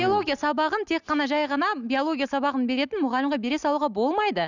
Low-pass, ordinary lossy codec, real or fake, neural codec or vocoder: 7.2 kHz; none; real; none